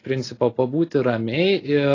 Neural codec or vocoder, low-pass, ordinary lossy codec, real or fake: none; 7.2 kHz; AAC, 32 kbps; real